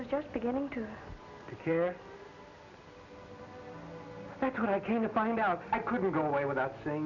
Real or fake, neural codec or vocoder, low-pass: real; none; 7.2 kHz